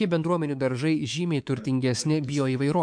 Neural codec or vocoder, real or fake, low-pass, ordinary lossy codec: codec, 24 kHz, 3.1 kbps, DualCodec; fake; 9.9 kHz; MP3, 64 kbps